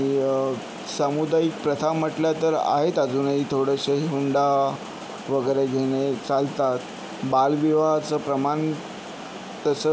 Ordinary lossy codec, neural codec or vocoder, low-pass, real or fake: none; none; none; real